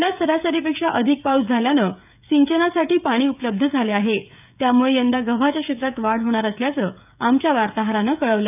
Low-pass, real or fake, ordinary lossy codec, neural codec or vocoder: 3.6 kHz; fake; none; codec, 16 kHz, 16 kbps, FreqCodec, smaller model